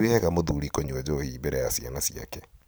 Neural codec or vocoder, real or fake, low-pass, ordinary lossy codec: vocoder, 44.1 kHz, 128 mel bands every 512 samples, BigVGAN v2; fake; none; none